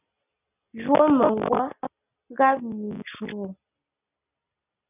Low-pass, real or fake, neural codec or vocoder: 3.6 kHz; real; none